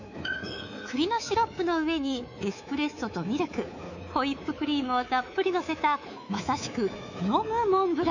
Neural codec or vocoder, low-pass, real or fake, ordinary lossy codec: codec, 24 kHz, 3.1 kbps, DualCodec; 7.2 kHz; fake; none